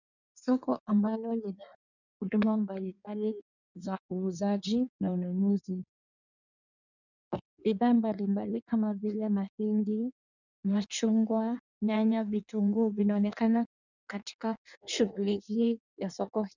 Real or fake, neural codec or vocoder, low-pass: fake; codec, 16 kHz in and 24 kHz out, 1.1 kbps, FireRedTTS-2 codec; 7.2 kHz